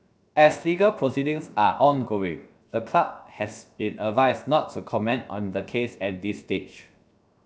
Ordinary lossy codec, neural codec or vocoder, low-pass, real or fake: none; codec, 16 kHz, 0.7 kbps, FocalCodec; none; fake